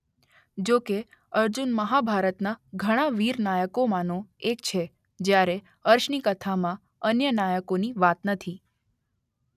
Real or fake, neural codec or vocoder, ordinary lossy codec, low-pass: real; none; none; 14.4 kHz